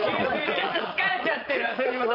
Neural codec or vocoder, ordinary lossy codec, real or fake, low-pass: vocoder, 22.05 kHz, 80 mel bands, Vocos; none; fake; 5.4 kHz